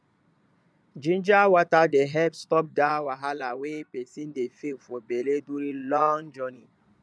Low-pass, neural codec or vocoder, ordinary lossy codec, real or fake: none; vocoder, 22.05 kHz, 80 mel bands, Vocos; none; fake